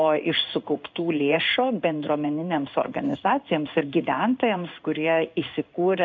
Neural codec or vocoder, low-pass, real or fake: codec, 16 kHz in and 24 kHz out, 1 kbps, XY-Tokenizer; 7.2 kHz; fake